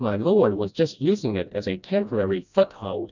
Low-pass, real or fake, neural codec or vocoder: 7.2 kHz; fake; codec, 16 kHz, 1 kbps, FreqCodec, smaller model